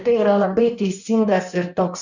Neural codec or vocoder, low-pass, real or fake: codec, 16 kHz in and 24 kHz out, 1.1 kbps, FireRedTTS-2 codec; 7.2 kHz; fake